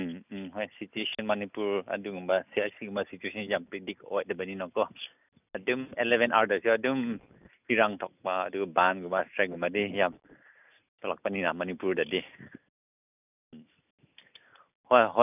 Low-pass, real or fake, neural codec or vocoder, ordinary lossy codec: 3.6 kHz; real; none; none